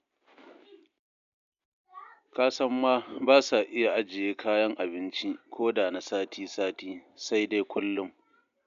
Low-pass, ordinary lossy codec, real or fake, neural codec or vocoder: 7.2 kHz; MP3, 64 kbps; real; none